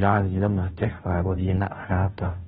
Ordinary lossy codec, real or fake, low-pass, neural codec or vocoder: AAC, 16 kbps; fake; 7.2 kHz; codec, 16 kHz, 0.5 kbps, FunCodec, trained on Chinese and English, 25 frames a second